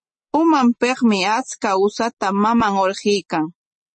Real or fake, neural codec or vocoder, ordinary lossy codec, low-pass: real; none; MP3, 32 kbps; 10.8 kHz